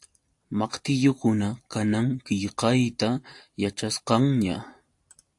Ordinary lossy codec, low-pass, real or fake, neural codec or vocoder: AAC, 64 kbps; 10.8 kHz; real; none